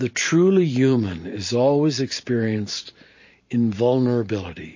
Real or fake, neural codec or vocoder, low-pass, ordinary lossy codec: fake; vocoder, 44.1 kHz, 128 mel bands every 512 samples, BigVGAN v2; 7.2 kHz; MP3, 32 kbps